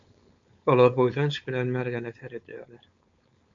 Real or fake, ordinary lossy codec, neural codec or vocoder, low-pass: fake; MP3, 64 kbps; codec, 16 kHz, 4.8 kbps, FACodec; 7.2 kHz